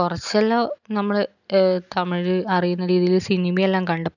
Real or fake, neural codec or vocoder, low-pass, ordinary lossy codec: real; none; 7.2 kHz; none